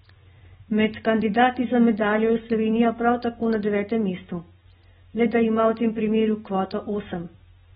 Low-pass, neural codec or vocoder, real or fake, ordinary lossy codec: 7.2 kHz; none; real; AAC, 16 kbps